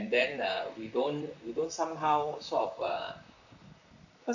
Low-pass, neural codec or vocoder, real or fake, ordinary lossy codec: 7.2 kHz; vocoder, 44.1 kHz, 128 mel bands, Pupu-Vocoder; fake; none